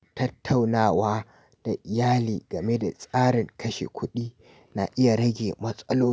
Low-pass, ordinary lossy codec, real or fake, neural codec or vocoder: none; none; real; none